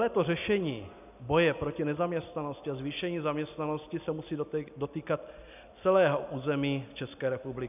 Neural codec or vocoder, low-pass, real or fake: none; 3.6 kHz; real